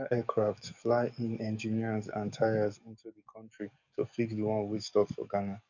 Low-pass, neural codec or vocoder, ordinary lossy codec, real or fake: 7.2 kHz; codec, 44.1 kHz, 7.8 kbps, DAC; none; fake